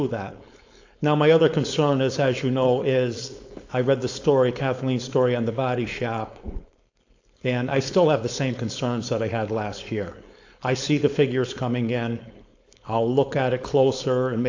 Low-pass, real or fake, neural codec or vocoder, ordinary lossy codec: 7.2 kHz; fake; codec, 16 kHz, 4.8 kbps, FACodec; AAC, 48 kbps